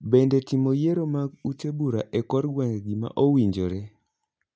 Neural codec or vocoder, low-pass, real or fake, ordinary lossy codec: none; none; real; none